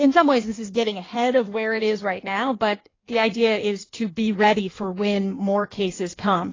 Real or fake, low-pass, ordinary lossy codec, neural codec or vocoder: fake; 7.2 kHz; AAC, 32 kbps; codec, 16 kHz in and 24 kHz out, 1.1 kbps, FireRedTTS-2 codec